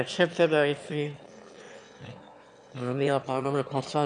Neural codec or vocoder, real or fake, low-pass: autoencoder, 22.05 kHz, a latent of 192 numbers a frame, VITS, trained on one speaker; fake; 9.9 kHz